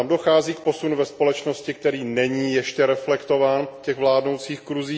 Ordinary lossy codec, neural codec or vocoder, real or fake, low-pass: none; none; real; none